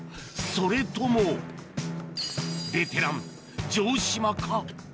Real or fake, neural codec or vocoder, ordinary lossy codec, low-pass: real; none; none; none